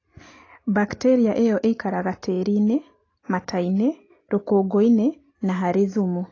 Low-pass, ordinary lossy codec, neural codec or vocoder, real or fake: 7.2 kHz; AAC, 32 kbps; none; real